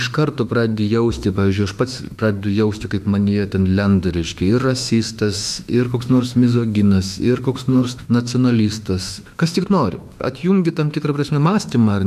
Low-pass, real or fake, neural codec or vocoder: 14.4 kHz; fake; autoencoder, 48 kHz, 32 numbers a frame, DAC-VAE, trained on Japanese speech